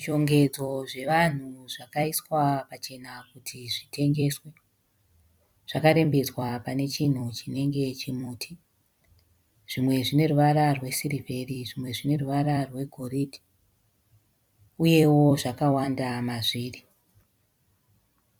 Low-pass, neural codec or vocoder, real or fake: 19.8 kHz; vocoder, 44.1 kHz, 128 mel bands every 256 samples, BigVGAN v2; fake